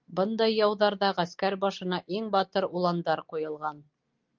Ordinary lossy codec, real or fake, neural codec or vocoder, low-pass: Opus, 32 kbps; real; none; 7.2 kHz